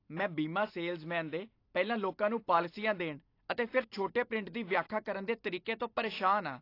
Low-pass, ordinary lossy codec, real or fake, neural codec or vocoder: 5.4 kHz; AAC, 32 kbps; real; none